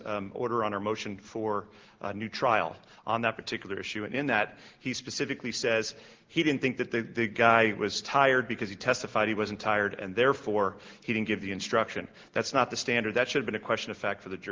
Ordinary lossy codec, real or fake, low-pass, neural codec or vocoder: Opus, 16 kbps; real; 7.2 kHz; none